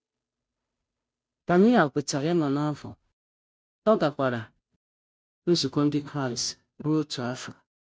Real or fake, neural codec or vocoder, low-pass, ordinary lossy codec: fake; codec, 16 kHz, 0.5 kbps, FunCodec, trained on Chinese and English, 25 frames a second; none; none